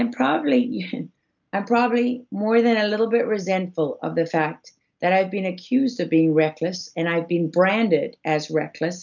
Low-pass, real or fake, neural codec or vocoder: 7.2 kHz; real; none